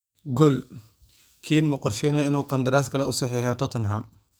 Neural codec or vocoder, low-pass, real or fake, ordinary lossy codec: codec, 44.1 kHz, 2.6 kbps, SNAC; none; fake; none